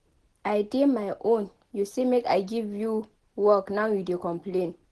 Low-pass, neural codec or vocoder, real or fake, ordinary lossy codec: 10.8 kHz; none; real; Opus, 16 kbps